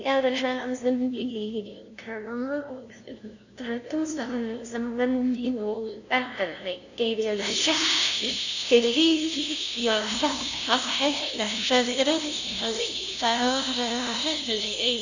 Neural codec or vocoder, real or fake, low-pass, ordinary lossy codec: codec, 16 kHz, 0.5 kbps, FunCodec, trained on LibriTTS, 25 frames a second; fake; 7.2 kHz; none